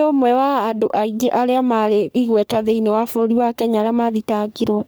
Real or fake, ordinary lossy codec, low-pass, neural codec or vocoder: fake; none; none; codec, 44.1 kHz, 3.4 kbps, Pupu-Codec